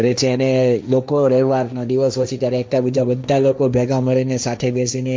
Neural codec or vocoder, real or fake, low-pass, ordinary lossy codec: codec, 16 kHz, 1.1 kbps, Voila-Tokenizer; fake; none; none